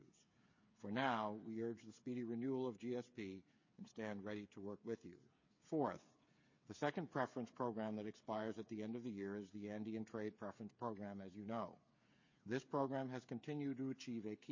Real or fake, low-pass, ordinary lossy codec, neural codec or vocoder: fake; 7.2 kHz; MP3, 32 kbps; codec, 16 kHz, 8 kbps, FreqCodec, smaller model